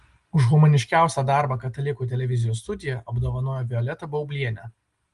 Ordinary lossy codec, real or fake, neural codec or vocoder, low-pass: Opus, 24 kbps; real; none; 10.8 kHz